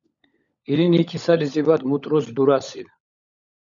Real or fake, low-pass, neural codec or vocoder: fake; 7.2 kHz; codec, 16 kHz, 16 kbps, FunCodec, trained on LibriTTS, 50 frames a second